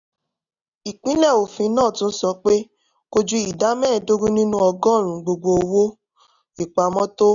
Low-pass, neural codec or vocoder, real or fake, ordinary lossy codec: 7.2 kHz; none; real; none